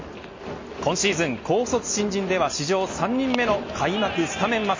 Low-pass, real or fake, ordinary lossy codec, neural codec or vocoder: 7.2 kHz; real; MP3, 32 kbps; none